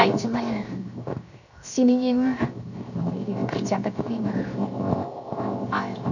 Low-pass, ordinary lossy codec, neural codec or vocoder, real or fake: 7.2 kHz; none; codec, 16 kHz, 0.7 kbps, FocalCodec; fake